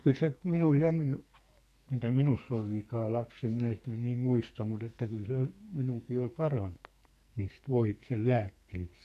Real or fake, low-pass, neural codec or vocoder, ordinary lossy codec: fake; 14.4 kHz; codec, 44.1 kHz, 2.6 kbps, SNAC; none